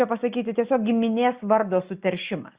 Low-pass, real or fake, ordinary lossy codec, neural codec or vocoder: 3.6 kHz; real; Opus, 64 kbps; none